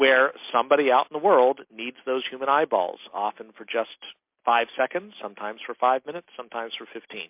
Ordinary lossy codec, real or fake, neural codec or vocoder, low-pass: MP3, 32 kbps; real; none; 3.6 kHz